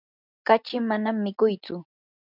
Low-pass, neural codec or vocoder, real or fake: 5.4 kHz; none; real